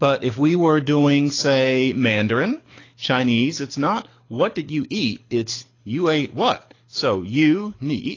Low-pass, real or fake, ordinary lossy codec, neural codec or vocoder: 7.2 kHz; fake; AAC, 32 kbps; codec, 24 kHz, 6 kbps, HILCodec